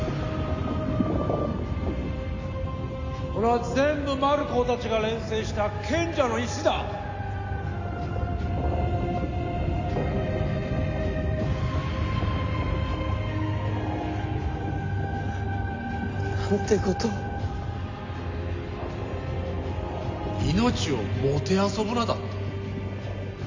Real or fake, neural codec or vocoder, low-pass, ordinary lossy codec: real; none; 7.2 kHz; AAC, 48 kbps